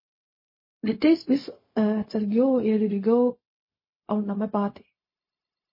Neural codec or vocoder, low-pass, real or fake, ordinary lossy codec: codec, 16 kHz, 0.4 kbps, LongCat-Audio-Codec; 5.4 kHz; fake; MP3, 24 kbps